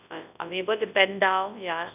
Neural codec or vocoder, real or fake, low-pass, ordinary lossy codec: codec, 24 kHz, 0.9 kbps, WavTokenizer, large speech release; fake; 3.6 kHz; none